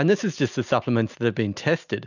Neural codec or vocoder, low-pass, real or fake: none; 7.2 kHz; real